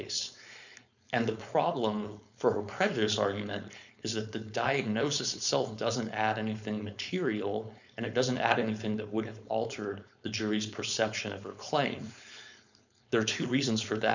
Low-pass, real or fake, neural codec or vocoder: 7.2 kHz; fake; codec, 16 kHz, 4.8 kbps, FACodec